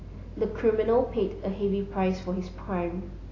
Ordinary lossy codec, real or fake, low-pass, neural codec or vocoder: AAC, 32 kbps; real; 7.2 kHz; none